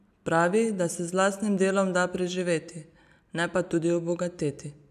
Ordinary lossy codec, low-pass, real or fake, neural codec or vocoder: none; 14.4 kHz; real; none